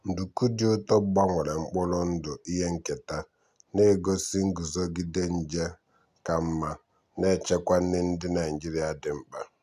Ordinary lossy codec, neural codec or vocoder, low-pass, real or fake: none; none; 14.4 kHz; real